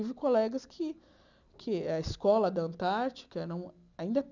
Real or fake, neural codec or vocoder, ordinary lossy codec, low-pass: real; none; none; 7.2 kHz